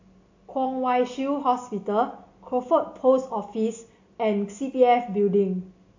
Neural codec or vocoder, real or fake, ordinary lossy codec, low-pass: none; real; AAC, 48 kbps; 7.2 kHz